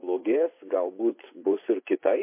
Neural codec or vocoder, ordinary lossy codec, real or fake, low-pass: vocoder, 24 kHz, 100 mel bands, Vocos; MP3, 24 kbps; fake; 3.6 kHz